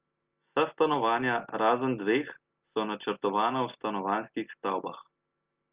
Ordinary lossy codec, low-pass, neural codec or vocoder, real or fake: Opus, 32 kbps; 3.6 kHz; none; real